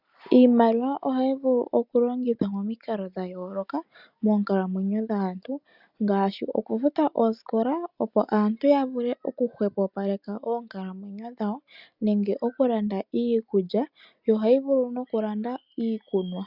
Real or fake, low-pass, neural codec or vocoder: real; 5.4 kHz; none